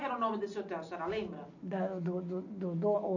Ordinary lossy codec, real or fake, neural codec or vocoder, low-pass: none; real; none; 7.2 kHz